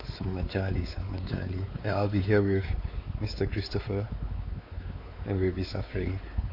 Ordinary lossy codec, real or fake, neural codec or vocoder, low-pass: AAC, 32 kbps; fake; codec, 16 kHz, 4 kbps, X-Codec, WavLM features, trained on Multilingual LibriSpeech; 5.4 kHz